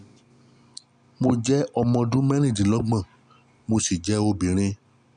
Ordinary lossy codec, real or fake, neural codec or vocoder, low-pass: none; real; none; 9.9 kHz